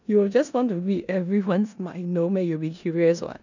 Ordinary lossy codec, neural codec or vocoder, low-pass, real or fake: none; codec, 16 kHz in and 24 kHz out, 0.9 kbps, LongCat-Audio-Codec, four codebook decoder; 7.2 kHz; fake